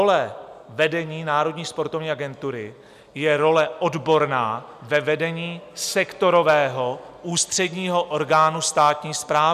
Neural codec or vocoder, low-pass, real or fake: none; 14.4 kHz; real